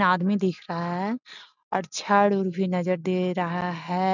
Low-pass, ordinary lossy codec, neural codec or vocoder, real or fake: 7.2 kHz; none; none; real